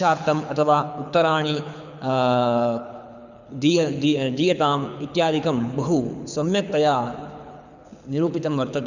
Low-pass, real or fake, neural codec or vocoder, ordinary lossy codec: 7.2 kHz; fake; codec, 24 kHz, 6 kbps, HILCodec; none